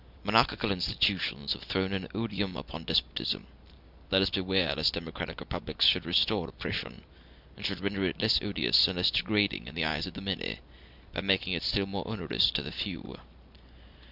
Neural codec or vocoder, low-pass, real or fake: none; 5.4 kHz; real